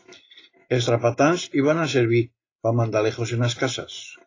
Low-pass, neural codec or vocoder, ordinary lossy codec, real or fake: 7.2 kHz; none; AAC, 32 kbps; real